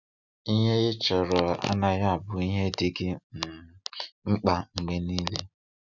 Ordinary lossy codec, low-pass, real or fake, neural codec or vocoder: none; 7.2 kHz; real; none